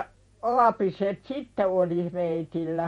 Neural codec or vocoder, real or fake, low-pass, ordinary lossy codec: vocoder, 48 kHz, 128 mel bands, Vocos; fake; 19.8 kHz; MP3, 48 kbps